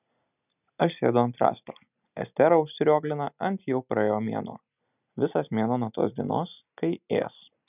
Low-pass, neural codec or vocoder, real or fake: 3.6 kHz; none; real